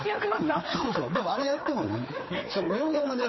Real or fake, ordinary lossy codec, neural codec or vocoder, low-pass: fake; MP3, 24 kbps; codec, 16 kHz, 4 kbps, FreqCodec, larger model; 7.2 kHz